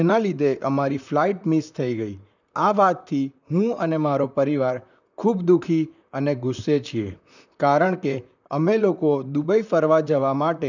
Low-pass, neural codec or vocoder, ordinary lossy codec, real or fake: 7.2 kHz; vocoder, 44.1 kHz, 128 mel bands, Pupu-Vocoder; none; fake